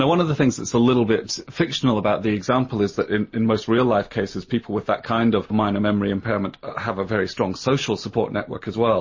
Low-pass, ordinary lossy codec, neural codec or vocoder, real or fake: 7.2 kHz; MP3, 32 kbps; none; real